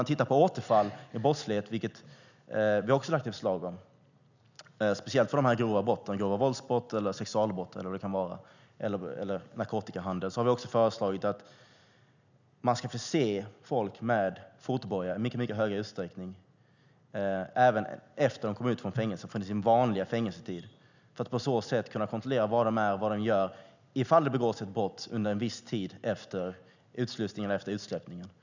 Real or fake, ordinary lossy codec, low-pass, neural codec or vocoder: real; none; 7.2 kHz; none